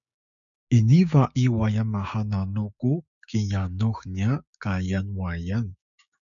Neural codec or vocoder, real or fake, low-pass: codec, 16 kHz, 6 kbps, DAC; fake; 7.2 kHz